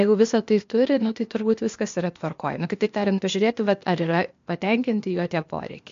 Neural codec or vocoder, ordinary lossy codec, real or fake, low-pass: codec, 16 kHz, 0.8 kbps, ZipCodec; MP3, 48 kbps; fake; 7.2 kHz